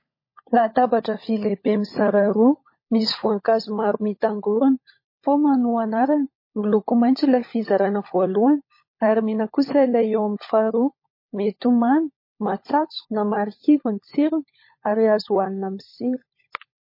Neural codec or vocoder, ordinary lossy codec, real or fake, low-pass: codec, 16 kHz, 16 kbps, FunCodec, trained on LibriTTS, 50 frames a second; MP3, 24 kbps; fake; 5.4 kHz